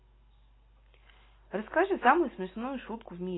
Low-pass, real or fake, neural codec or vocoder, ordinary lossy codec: 7.2 kHz; real; none; AAC, 16 kbps